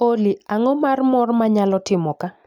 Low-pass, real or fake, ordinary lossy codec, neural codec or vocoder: 19.8 kHz; real; none; none